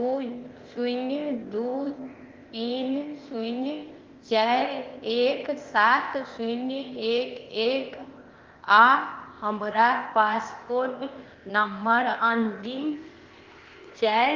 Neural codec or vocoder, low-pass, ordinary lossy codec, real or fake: codec, 16 kHz, 0.8 kbps, ZipCodec; 7.2 kHz; Opus, 32 kbps; fake